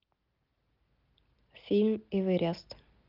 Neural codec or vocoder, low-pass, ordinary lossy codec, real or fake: none; 5.4 kHz; Opus, 24 kbps; real